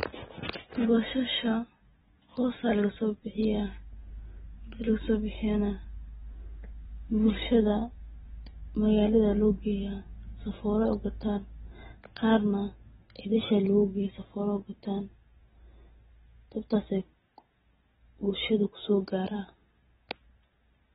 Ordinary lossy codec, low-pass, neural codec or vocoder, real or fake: AAC, 16 kbps; 10.8 kHz; none; real